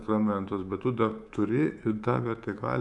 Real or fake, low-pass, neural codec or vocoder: fake; 10.8 kHz; codec, 24 kHz, 3.1 kbps, DualCodec